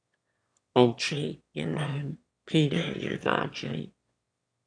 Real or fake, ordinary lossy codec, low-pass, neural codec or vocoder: fake; AAC, 48 kbps; 9.9 kHz; autoencoder, 22.05 kHz, a latent of 192 numbers a frame, VITS, trained on one speaker